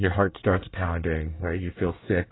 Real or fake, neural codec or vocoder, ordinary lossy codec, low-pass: fake; codec, 24 kHz, 1 kbps, SNAC; AAC, 16 kbps; 7.2 kHz